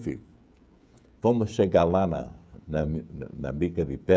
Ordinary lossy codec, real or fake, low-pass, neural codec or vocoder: none; fake; none; codec, 16 kHz, 16 kbps, FreqCodec, smaller model